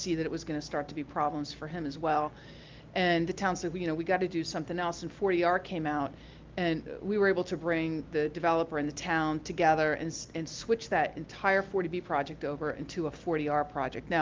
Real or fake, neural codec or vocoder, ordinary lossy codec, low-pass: real; none; Opus, 32 kbps; 7.2 kHz